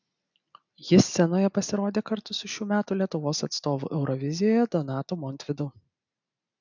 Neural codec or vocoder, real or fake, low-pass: none; real; 7.2 kHz